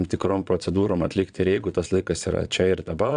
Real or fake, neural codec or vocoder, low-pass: fake; vocoder, 22.05 kHz, 80 mel bands, Vocos; 9.9 kHz